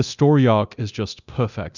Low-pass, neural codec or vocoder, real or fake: 7.2 kHz; codec, 24 kHz, 0.9 kbps, DualCodec; fake